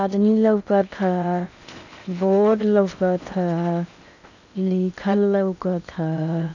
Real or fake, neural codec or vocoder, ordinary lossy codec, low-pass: fake; codec, 16 kHz in and 24 kHz out, 0.8 kbps, FocalCodec, streaming, 65536 codes; none; 7.2 kHz